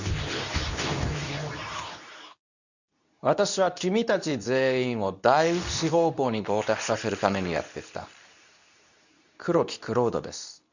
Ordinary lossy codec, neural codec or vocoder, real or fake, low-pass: none; codec, 24 kHz, 0.9 kbps, WavTokenizer, medium speech release version 2; fake; 7.2 kHz